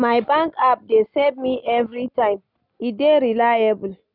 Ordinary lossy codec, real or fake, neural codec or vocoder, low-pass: none; real; none; 5.4 kHz